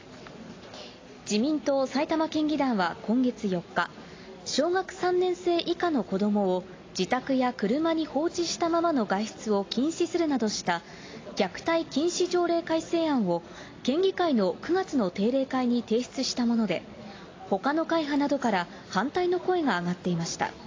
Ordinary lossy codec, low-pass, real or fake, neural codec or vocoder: AAC, 32 kbps; 7.2 kHz; real; none